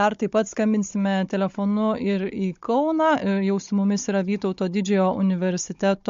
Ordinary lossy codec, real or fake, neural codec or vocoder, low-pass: MP3, 48 kbps; fake; codec, 16 kHz, 16 kbps, FunCodec, trained on Chinese and English, 50 frames a second; 7.2 kHz